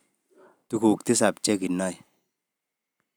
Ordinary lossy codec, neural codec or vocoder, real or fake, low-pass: none; none; real; none